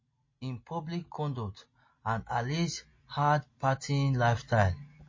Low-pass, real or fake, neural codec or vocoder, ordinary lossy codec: 7.2 kHz; real; none; MP3, 32 kbps